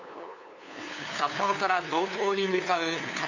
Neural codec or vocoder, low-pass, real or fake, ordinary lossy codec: codec, 16 kHz, 2 kbps, FunCodec, trained on LibriTTS, 25 frames a second; 7.2 kHz; fake; none